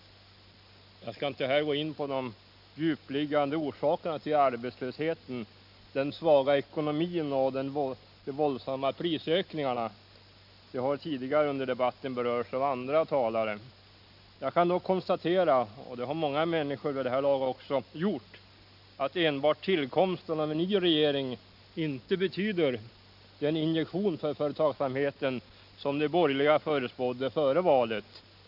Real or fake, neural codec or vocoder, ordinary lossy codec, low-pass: real; none; none; 5.4 kHz